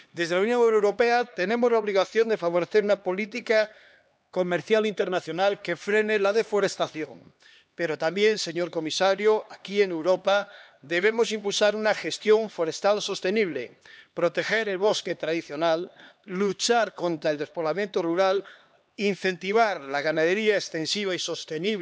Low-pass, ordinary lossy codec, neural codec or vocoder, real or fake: none; none; codec, 16 kHz, 2 kbps, X-Codec, HuBERT features, trained on LibriSpeech; fake